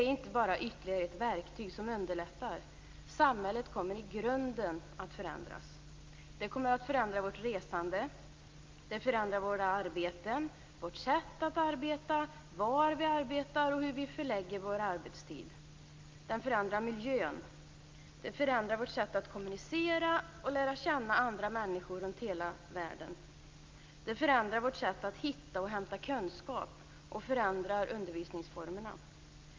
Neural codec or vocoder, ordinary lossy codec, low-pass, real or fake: none; Opus, 24 kbps; 7.2 kHz; real